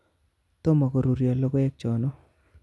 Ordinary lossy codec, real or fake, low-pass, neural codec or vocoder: none; real; none; none